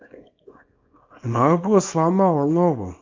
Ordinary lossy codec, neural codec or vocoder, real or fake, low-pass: MP3, 48 kbps; codec, 24 kHz, 0.9 kbps, WavTokenizer, small release; fake; 7.2 kHz